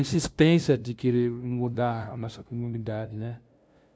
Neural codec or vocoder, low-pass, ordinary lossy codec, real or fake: codec, 16 kHz, 0.5 kbps, FunCodec, trained on LibriTTS, 25 frames a second; none; none; fake